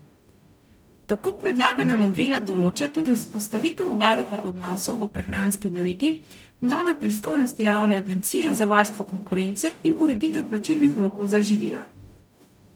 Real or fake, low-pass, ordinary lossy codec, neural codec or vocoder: fake; none; none; codec, 44.1 kHz, 0.9 kbps, DAC